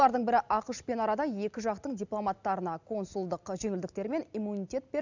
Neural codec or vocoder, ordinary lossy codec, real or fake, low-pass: none; none; real; 7.2 kHz